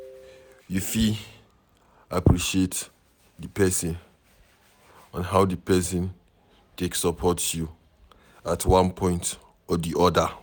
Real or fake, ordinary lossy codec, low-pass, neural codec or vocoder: real; none; none; none